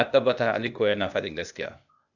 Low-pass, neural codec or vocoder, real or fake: 7.2 kHz; codec, 16 kHz, 0.8 kbps, ZipCodec; fake